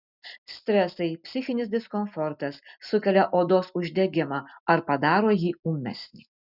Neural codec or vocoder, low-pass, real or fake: none; 5.4 kHz; real